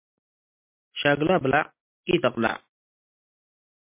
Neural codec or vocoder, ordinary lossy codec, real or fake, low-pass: none; MP3, 24 kbps; real; 3.6 kHz